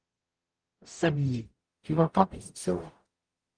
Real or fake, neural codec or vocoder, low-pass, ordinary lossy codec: fake; codec, 44.1 kHz, 0.9 kbps, DAC; 9.9 kHz; Opus, 24 kbps